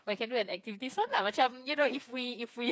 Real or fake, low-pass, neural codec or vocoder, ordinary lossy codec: fake; none; codec, 16 kHz, 4 kbps, FreqCodec, smaller model; none